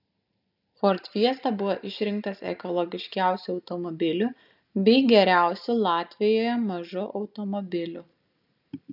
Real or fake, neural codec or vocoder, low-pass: real; none; 5.4 kHz